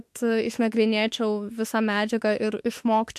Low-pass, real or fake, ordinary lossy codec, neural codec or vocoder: 14.4 kHz; fake; MP3, 64 kbps; autoencoder, 48 kHz, 32 numbers a frame, DAC-VAE, trained on Japanese speech